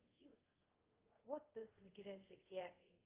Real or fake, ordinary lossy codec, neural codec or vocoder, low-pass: fake; Opus, 24 kbps; codec, 24 kHz, 0.5 kbps, DualCodec; 3.6 kHz